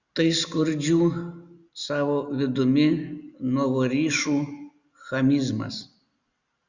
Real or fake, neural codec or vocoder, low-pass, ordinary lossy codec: real; none; 7.2 kHz; Opus, 64 kbps